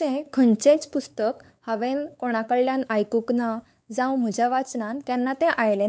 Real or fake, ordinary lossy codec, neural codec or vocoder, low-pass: fake; none; codec, 16 kHz, 4 kbps, X-Codec, WavLM features, trained on Multilingual LibriSpeech; none